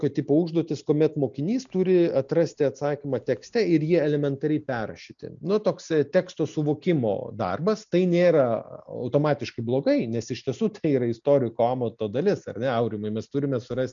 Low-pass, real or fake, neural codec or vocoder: 7.2 kHz; real; none